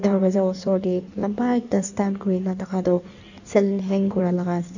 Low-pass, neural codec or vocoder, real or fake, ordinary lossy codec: 7.2 kHz; codec, 16 kHz in and 24 kHz out, 1.1 kbps, FireRedTTS-2 codec; fake; none